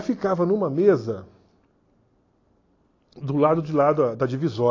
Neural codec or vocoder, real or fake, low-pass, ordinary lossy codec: none; real; 7.2 kHz; AAC, 32 kbps